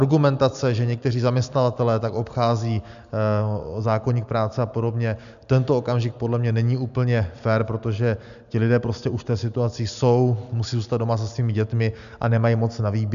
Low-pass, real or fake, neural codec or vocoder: 7.2 kHz; real; none